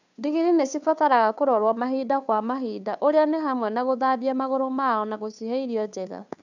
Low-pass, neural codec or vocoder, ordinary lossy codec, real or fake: 7.2 kHz; codec, 16 kHz, 2 kbps, FunCodec, trained on Chinese and English, 25 frames a second; none; fake